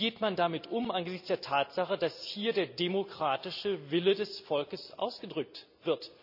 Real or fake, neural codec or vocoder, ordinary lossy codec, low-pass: real; none; none; 5.4 kHz